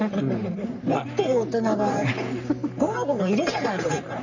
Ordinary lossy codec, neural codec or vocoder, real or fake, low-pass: none; codec, 44.1 kHz, 3.4 kbps, Pupu-Codec; fake; 7.2 kHz